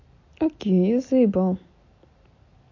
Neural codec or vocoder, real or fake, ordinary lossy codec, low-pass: none; real; MP3, 48 kbps; 7.2 kHz